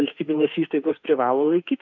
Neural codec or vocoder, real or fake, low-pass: autoencoder, 48 kHz, 32 numbers a frame, DAC-VAE, trained on Japanese speech; fake; 7.2 kHz